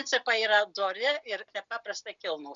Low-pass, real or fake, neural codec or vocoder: 7.2 kHz; real; none